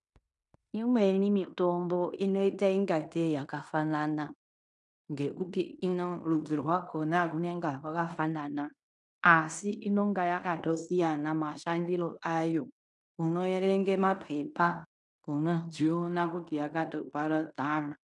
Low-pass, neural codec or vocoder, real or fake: 10.8 kHz; codec, 16 kHz in and 24 kHz out, 0.9 kbps, LongCat-Audio-Codec, fine tuned four codebook decoder; fake